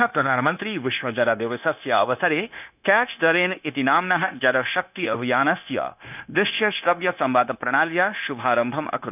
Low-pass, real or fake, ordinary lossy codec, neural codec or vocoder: 3.6 kHz; fake; none; codec, 16 kHz, 0.9 kbps, LongCat-Audio-Codec